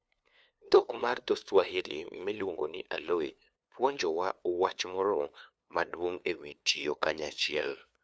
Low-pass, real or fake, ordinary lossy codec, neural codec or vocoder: none; fake; none; codec, 16 kHz, 2 kbps, FunCodec, trained on LibriTTS, 25 frames a second